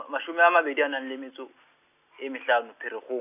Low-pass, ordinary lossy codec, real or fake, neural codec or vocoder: 3.6 kHz; none; real; none